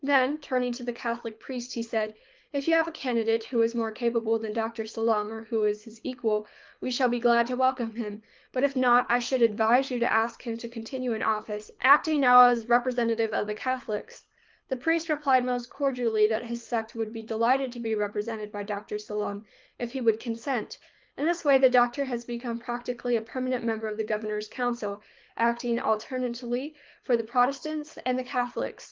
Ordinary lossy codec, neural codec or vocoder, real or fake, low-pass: Opus, 32 kbps; codec, 24 kHz, 6 kbps, HILCodec; fake; 7.2 kHz